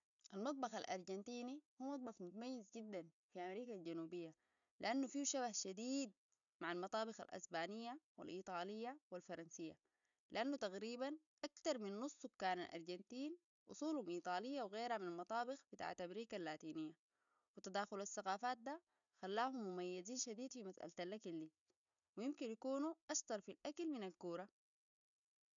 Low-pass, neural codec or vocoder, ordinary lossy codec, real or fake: 7.2 kHz; none; none; real